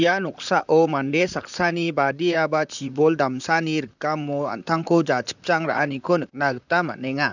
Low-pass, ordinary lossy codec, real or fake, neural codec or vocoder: 7.2 kHz; none; fake; vocoder, 44.1 kHz, 128 mel bands, Pupu-Vocoder